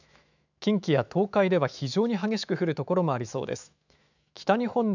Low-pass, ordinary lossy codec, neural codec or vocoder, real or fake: 7.2 kHz; none; autoencoder, 48 kHz, 128 numbers a frame, DAC-VAE, trained on Japanese speech; fake